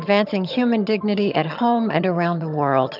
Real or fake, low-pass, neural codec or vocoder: fake; 5.4 kHz; vocoder, 22.05 kHz, 80 mel bands, HiFi-GAN